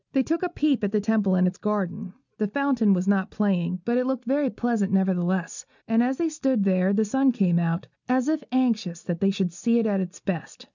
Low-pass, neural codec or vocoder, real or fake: 7.2 kHz; none; real